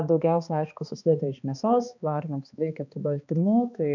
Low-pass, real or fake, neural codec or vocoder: 7.2 kHz; fake; codec, 16 kHz, 2 kbps, X-Codec, HuBERT features, trained on balanced general audio